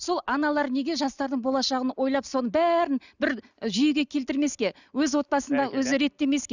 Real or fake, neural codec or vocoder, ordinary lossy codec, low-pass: real; none; none; 7.2 kHz